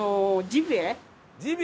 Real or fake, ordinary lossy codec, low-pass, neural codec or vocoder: real; none; none; none